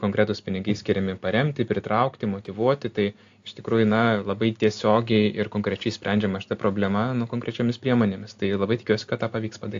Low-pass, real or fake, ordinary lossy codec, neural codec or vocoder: 7.2 kHz; real; AAC, 64 kbps; none